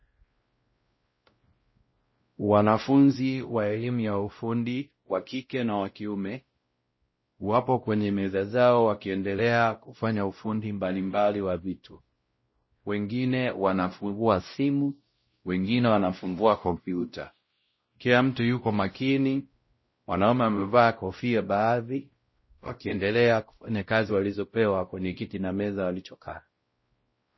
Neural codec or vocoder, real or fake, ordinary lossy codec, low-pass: codec, 16 kHz, 0.5 kbps, X-Codec, WavLM features, trained on Multilingual LibriSpeech; fake; MP3, 24 kbps; 7.2 kHz